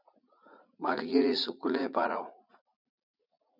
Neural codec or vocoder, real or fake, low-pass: vocoder, 44.1 kHz, 80 mel bands, Vocos; fake; 5.4 kHz